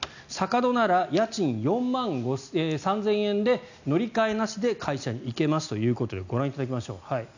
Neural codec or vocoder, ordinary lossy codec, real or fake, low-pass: none; none; real; 7.2 kHz